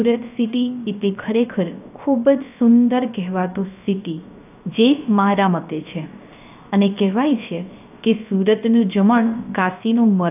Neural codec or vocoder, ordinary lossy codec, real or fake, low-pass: codec, 16 kHz, 0.3 kbps, FocalCodec; AAC, 32 kbps; fake; 3.6 kHz